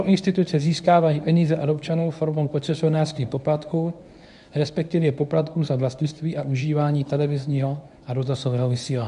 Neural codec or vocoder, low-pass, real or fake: codec, 24 kHz, 0.9 kbps, WavTokenizer, medium speech release version 1; 10.8 kHz; fake